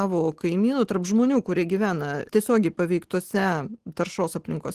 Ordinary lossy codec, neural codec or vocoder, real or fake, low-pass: Opus, 16 kbps; none; real; 14.4 kHz